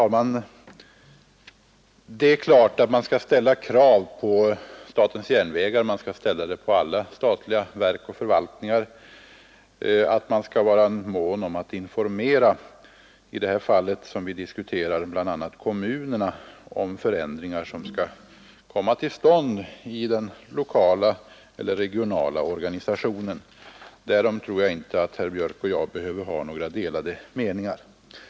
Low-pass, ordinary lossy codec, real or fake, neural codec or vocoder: none; none; real; none